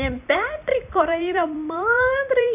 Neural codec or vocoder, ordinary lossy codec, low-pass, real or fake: vocoder, 44.1 kHz, 128 mel bands every 256 samples, BigVGAN v2; none; 3.6 kHz; fake